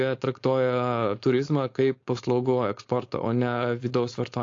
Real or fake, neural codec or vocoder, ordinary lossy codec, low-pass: fake; codec, 16 kHz, 4.8 kbps, FACodec; AAC, 48 kbps; 7.2 kHz